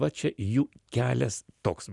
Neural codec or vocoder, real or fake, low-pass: none; real; 10.8 kHz